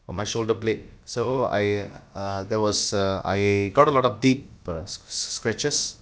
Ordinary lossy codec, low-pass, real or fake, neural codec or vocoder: none; none; fake; codec, 16 kHz, about 1 kbps, DyCAST, with the encoder's durations